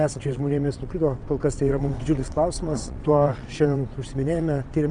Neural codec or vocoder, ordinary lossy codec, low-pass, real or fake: vocoder, 22.05 kHz, 80 mel bands, WaveNeXt; Opus, 64 kbps; 9.9 kHz; fake